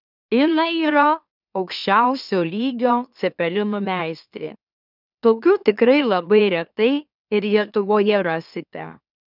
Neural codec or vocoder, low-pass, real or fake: autoencoder, 44.1 kHz, a latent of 192 numbers a frame, MeloTTS; 5.4 kHz; fake